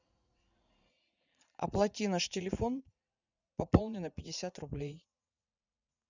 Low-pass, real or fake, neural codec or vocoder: 7.2 kHz; fake; vocoder, 44.1 kHz, 80 mel bands, Vocos